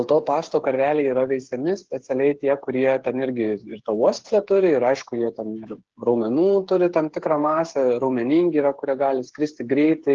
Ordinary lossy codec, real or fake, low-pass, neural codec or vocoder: Opus, 16 kbps; fake; 7.2 kHz; codec, 16 kHz, 8 kbps, FreqCodec, smaller model